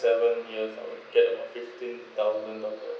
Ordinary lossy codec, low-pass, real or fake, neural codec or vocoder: none; none; real; none